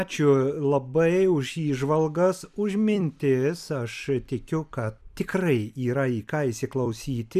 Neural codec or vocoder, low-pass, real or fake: vocoder, 44.1 kHz, 128 mel bands every 256 samples, BigVGAN v2; 14.4 kHz; fake